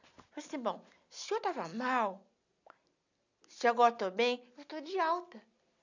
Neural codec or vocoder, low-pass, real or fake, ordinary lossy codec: none; 7.2 kHz; real; none